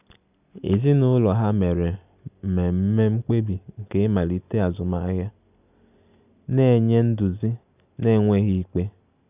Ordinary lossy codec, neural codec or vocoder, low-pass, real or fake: none; none; 3.6 kHz; real